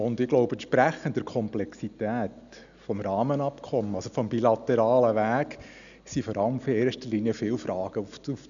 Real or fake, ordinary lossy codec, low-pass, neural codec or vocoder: real; none; 7.2 kHz; none